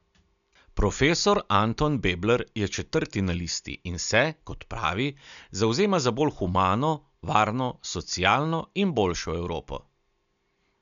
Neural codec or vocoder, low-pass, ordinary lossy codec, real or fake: none; 7.2 kHz; none; real